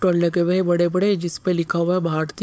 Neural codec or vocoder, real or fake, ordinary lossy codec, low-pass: codec, 16 kHz, 4.8 kbps, FACodec; fake; none; none